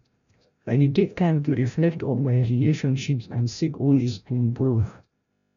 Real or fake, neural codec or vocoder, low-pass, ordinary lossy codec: fake; codec, 16 kHz, 0.5 kbps, FreqCodec, larger model; 7.2 kHz; none